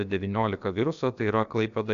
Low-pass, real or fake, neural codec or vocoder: 7.2 kHz; fake; codec, 16 kHz, about 1 kbps, DyCAST, with the encoder's durations